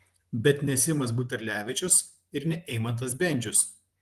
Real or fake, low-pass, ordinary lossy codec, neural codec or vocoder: fake; 14.4 kHz; Opus, 24 kbps; vocoder, 44.1 kHz, 128 mel bands, Pupu-Vocoder